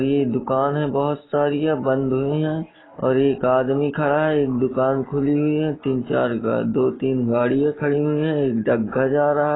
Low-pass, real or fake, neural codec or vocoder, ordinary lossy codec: 7.2 kHz; real; none; AAC, 16 kbps